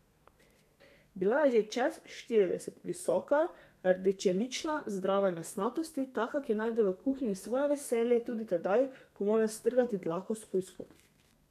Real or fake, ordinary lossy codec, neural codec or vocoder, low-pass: fake; none; codec, 32 kHz, 1.9 kbps, SNAC; 14.4 kHz